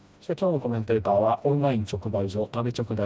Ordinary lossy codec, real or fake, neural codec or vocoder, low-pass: none; fake; codec, 16 kHz, 1 kbps, FreqCodec, smaller model; none